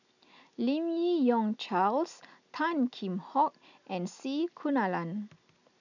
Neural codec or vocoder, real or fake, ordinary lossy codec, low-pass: none; real; none; 7.2 kHz